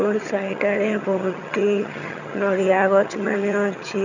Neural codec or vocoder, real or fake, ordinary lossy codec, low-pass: vocoder, 22.05 kHz, 80 mel bands, HiFi-GAN; fake; none; 7.2 kHz